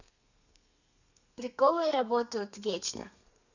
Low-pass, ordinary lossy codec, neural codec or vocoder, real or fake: 7.2 kHz; none; codec, 32 kHz, 1.9 kbps, SNAC; fake